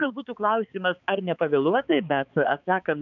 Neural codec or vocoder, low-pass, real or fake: codec, 16 kHz, 4 kbps, X-Codec, HuBERT features, trained on balanced general audio; 7.2 kHz; fake